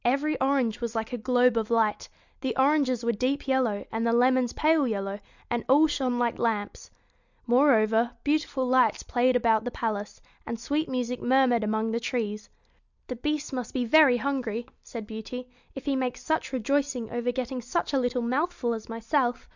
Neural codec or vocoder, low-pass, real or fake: none; 7.2 kHz; real